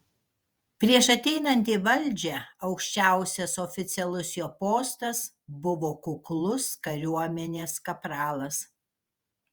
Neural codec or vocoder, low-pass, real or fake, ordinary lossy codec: vocoder, 48 kHz, 128 mel bands, Vocos; 19.8 kHz; fake; Opus, 64 kbps